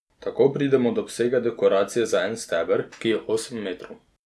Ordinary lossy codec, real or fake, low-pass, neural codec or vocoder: none; real; none; none